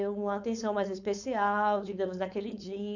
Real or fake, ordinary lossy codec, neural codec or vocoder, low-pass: fake; none; codec, 16 kHz, 4.8 kbps, FACodec; 7.2 kHz